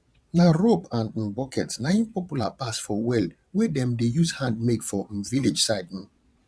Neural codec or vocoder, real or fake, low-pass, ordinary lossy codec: vocoder, 22.05 kHz, 80 mel bands, Vocos; fake; none; none